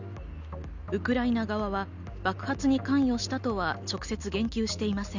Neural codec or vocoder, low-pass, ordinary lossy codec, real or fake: none; 7.2 kHz; none; real